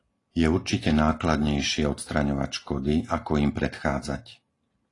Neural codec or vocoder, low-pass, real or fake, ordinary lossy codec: none; 10.8 kHz; real; AAC, 32 kbps